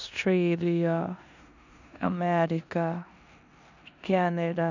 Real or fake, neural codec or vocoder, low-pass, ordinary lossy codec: fake; codec, 16 kHz in and 24 kHz out, 0.9 kbps, LongCat-Audio-Codec, four codebook decoder; 7.2 kHz; none